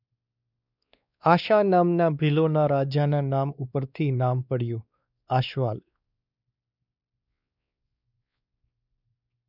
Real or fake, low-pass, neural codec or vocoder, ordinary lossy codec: fake; 5.4 kHz; codec, 16 kHz, 2 kbps, X-Codec, WavLM features, trained on Multilingual LibriSpeech; none